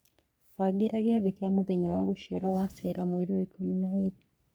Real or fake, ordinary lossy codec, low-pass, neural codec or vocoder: fake; none; none; codec, 44.1 kHz, 3.4 kbps, Pupu-Codec